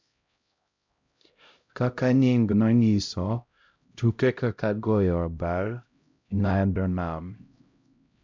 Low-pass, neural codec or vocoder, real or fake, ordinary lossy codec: 7.2 kHz; codec, 16 kHz, 0.5 kbps, X-Codec, HuBERT features, trained on LibriSpeech; fake; MP3, 64 kbps